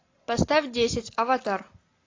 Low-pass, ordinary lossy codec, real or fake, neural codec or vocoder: 7.2 kHz; AAC, 32 kbps; real; none